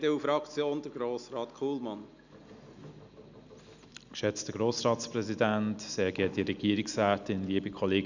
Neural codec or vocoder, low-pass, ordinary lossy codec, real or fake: none; 7.2 kHz; none; real